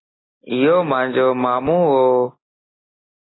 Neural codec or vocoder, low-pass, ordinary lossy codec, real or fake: none; 7.2 kHz; AAC, 16 kbps; real